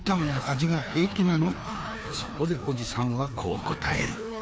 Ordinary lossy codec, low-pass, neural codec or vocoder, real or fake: none; none; codec, 16 kHz, 2 kbps, FreqCodec, larger model; fake